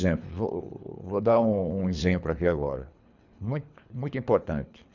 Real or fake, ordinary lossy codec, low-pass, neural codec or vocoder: fake; none; 7.2 kHz; codec, 24 kHz, 3 kbps, HILCodec